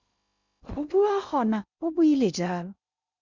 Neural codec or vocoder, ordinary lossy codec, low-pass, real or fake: codec, 16 kHz in and 24 kHz out, 0.6 kbps, FocalCodec, streaming, 2048 codes; Opus, 64 kbps; 7.2 kHz; fake